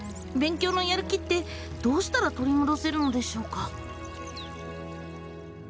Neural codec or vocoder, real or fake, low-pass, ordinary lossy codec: none; real; none; none